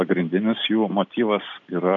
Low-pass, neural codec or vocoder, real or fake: 7.2 kHz; none; real